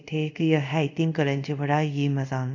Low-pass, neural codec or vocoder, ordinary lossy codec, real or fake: 7.2 kHz; codec, 24 kHz, 0.5 kbps, DualCodec; none; fake